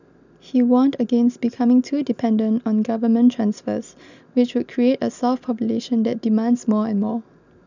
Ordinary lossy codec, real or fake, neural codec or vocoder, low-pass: none; real; none; 7.2 kHz